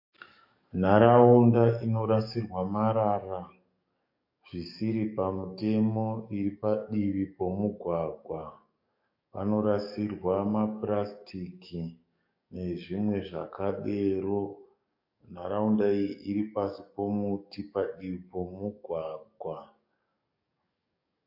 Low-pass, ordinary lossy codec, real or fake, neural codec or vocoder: 5.4 kHz; MP3, 32 kbps; fake; codec, 44.1 kHz, 7.8 kbps, DAC